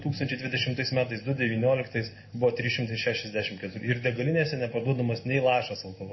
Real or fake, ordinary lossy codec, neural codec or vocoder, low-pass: real; MP3, 24 kbps; none; 7.2 kHz